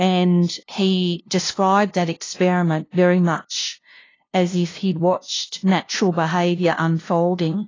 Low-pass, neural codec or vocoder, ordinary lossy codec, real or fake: 7.2 kHz; codec, 16 kHz, 0.5 kbps, FunCodec, trained on LibriTTS, 25 frames a second; AAC, 32 kbps; fake